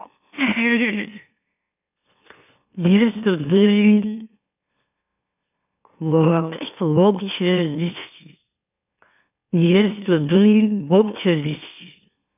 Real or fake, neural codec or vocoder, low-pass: fake; autoencoder, 44.1 kHz, a latent of 192 numbers a frame, MeloTTS; 3.6 kHz